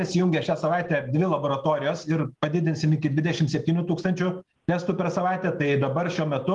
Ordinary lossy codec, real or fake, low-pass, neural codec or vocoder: Opus, 24 kbps; real; 10.8 kHz; none